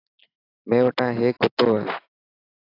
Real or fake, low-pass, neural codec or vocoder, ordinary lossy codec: real; 5.4 kHz; none; AAC, 32 kbps